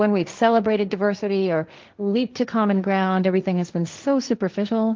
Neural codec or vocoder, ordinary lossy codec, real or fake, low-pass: codec, 16 kHz, 1.1 kbps, Voila-Tokenizer; Opus, 32 kbps; fake; 7.2 kHz